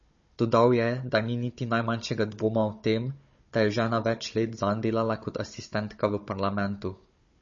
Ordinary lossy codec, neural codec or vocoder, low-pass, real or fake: MP3, 32 kbps; codec, 16 kHz, 16 kbps, FunCodec, trained on Chinese and English, 50 frames a second; 7.2 kHz; fake